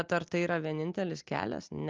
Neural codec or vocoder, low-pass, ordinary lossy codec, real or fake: none; 7.2 kHz; Opus, 24 kbps; real